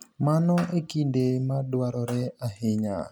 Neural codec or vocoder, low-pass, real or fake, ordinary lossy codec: none; none; real; none